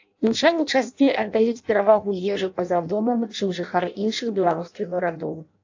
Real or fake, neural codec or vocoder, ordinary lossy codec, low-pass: fake; codec, 16 kHz in and 24 kHz out, 0.6 kbps, FireRedTTS-2 codec; AAC, 48 kbps; 7.2 kHz